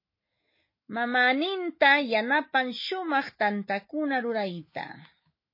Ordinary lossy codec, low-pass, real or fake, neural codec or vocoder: MP3, 24 kbps; 5.4 kHz; fake; autoencoder, 48 kHz, 128 numbers a frame, DAC-VAE, trained on Japanese speech